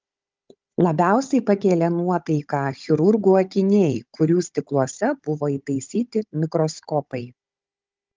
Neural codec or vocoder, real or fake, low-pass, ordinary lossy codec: codec, 16 kHz, 16 kbps, FunCodec, trained on Chinese and English, 50 frames a second; fake; 7.2 kHz; Opus, 32 kbps